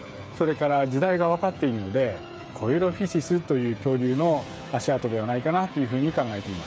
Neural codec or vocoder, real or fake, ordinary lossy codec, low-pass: codec, 16 kHz, 8 kbps, FreqCodec, smaller model; fake; none; none